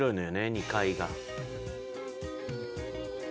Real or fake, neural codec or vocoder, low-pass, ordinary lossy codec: real; none; none; none